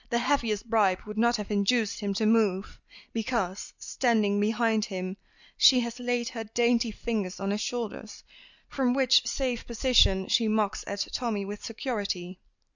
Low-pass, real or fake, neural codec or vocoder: 7.2 kHz; real; none